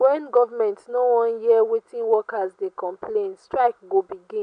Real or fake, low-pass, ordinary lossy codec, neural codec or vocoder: real; 9.9 kHz; none; none